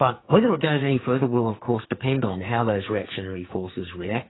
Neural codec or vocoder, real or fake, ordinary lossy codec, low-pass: codec, 44.1 kHz, 2.6 kbps, SNAC; fake; AAC, 16 kbps; 7.2 kHz